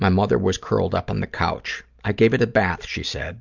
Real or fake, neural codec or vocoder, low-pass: real; none; 7.2 kHz